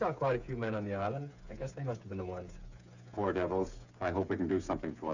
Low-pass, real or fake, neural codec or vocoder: 7.2 kHz; fake; codec, 16 kHz, 8 kbps, FreqCodec, smaller model